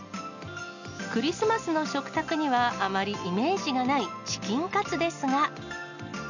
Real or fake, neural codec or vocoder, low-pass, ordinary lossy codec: real; none; 7.2 kHz; none